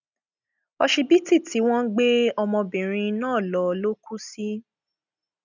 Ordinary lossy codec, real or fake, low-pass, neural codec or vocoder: none; real; 7.2 kHz; none